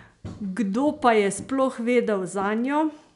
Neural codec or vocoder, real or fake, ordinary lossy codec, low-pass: none; real; none; 10.8 kHz